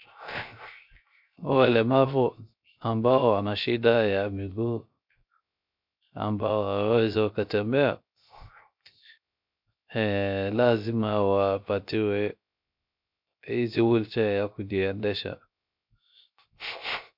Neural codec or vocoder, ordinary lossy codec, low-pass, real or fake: codec, 16 kHz, 0.3 kbps, FocalCodec; MP3, 48 kbps; 5.4 kHz; fake